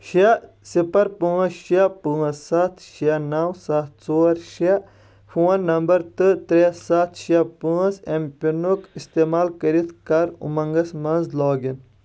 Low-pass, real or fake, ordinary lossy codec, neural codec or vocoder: none; real; none; none